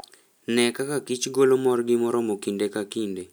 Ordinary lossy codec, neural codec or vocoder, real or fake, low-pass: none; none; real; none